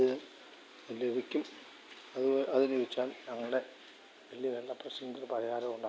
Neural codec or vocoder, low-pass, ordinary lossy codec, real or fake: none; none; none; real